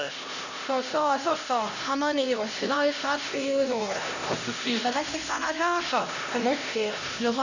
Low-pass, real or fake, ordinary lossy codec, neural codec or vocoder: 7.2 kHz; fake; none; codec, 16 kHz, 1 kbps, X-Codec, HuBERT features, trained on LibriSpeech